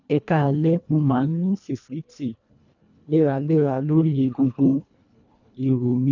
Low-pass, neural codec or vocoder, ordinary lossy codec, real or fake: 7.2 kHz; codec, 24 kHz, 1.5 kbps, HILCodec; none; fake